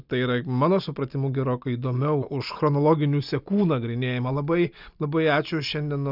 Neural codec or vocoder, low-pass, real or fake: none; 5.4 kHz; real